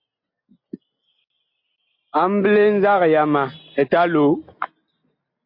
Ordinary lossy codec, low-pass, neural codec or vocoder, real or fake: MP3, 32 kbps; 5.4 kHz; none; real